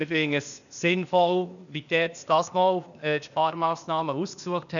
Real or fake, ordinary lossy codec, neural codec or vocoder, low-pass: fake; none; codec, 16 kHz, 0.8 kbps, ZipCodec; 7.2 kHz